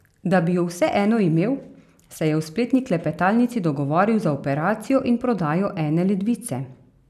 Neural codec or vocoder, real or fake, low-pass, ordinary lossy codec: none; real; 14.4 kHz; none